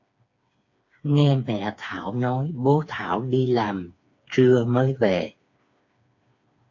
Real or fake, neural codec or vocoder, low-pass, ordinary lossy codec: fake; codec, 16 kHz, 4 kbps, FreqCodec, smaller model; 7.2 kHz; AAC, 48 kbps